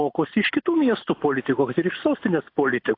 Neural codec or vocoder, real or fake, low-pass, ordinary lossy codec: none; real; 5.4 kHz; AAC, 32 kbps